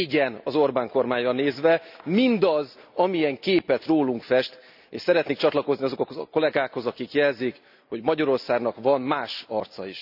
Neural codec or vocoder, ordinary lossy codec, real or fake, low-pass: none; none; real; 5.4 kHz